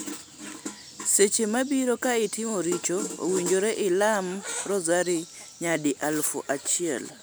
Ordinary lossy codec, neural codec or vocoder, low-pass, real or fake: none; none; none; real